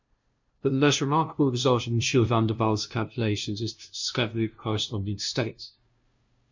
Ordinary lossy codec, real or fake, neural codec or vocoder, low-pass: MP3, 64 kbps; fake; codec, 16 kHz, 0.5 kbps, FunCodec, trained on LibriTTS, 25 frames a second; 7.2 kHz